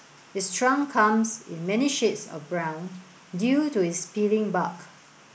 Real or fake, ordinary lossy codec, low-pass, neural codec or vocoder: real; none; none; none